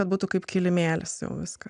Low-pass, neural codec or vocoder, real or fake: 9.9 kHz; none; real